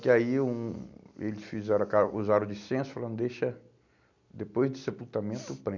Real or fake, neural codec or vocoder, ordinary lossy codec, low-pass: real; none; none; 7.2 kHz